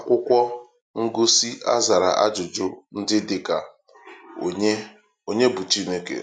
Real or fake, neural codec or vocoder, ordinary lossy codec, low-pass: real; none; none; 9.9 kHz